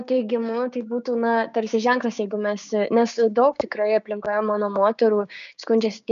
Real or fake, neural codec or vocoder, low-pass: fake; codec, 16 kHz, 6 kbps, DAC; 7.2 kHz